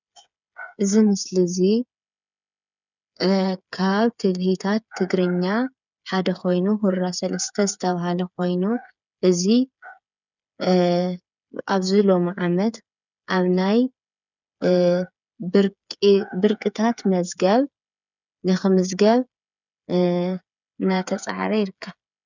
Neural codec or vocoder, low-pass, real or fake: codec, 16 kHz, 8 kbps, FreqCodec, smaller model; 7.2 kHz; fake